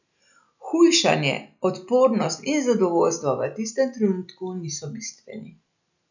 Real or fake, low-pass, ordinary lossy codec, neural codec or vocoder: real; 7.2 kHz; none; none